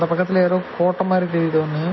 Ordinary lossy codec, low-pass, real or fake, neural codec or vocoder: MP3, 24 kbps; 7.2 kHz; real; none